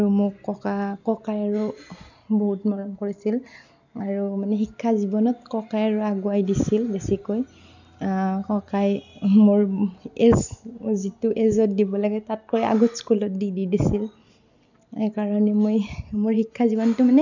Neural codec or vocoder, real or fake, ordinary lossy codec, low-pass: none; real; none; 7.2 kHz